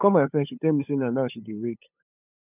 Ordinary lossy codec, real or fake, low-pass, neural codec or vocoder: none; fake; 3.6 kHz; codec, 16 kHz, 8 kbps, FunCodec, trained on LibriTTS, 25 frames a second